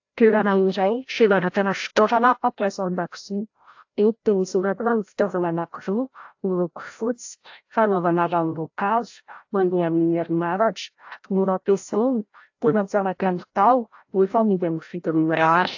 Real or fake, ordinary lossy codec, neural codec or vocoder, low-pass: fake; AAC, 48 kbps; codec, 16 kHz, 0.5 kbps, FreqCodec, larger model; 7.2 kHz